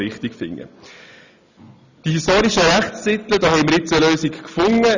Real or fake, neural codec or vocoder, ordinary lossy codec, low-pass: real; none; none; 7.2 kHz